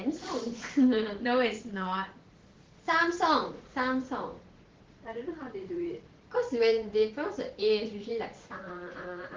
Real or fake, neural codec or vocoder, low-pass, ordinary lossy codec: fake; vocoder, 44.1 kHz, 80 mel bands, Vocos; 7.2 kHz; Opus, 16 kbps